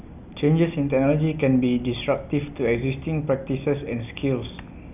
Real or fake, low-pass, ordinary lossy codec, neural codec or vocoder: real; 3.6 kHz; none; none